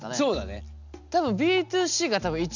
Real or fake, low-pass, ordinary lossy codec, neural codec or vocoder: real; 7.2 kHz; none; none